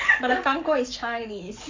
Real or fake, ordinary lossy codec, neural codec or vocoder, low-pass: fake; MP3, 64 kbps; codec, 44.1 kHz, 7.8 kbps, Pupu-Codec; 7.2 kHz